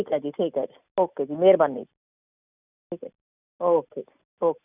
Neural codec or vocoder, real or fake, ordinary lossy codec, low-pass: none; real; none; 3.6 kHz